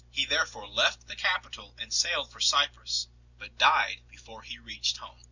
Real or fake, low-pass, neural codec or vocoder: real; 7.2 kHz; none